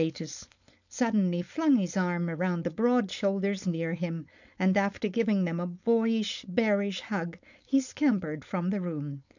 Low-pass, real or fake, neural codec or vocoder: 7.2 kHz; fake; codec, 16 kHz, 4.8 kbps, FACodec